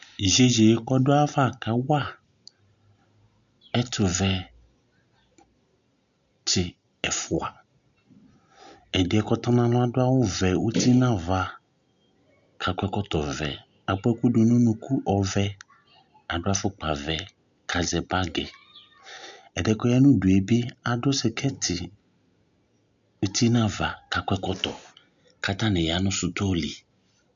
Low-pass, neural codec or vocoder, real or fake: 7.2 kHz; none; real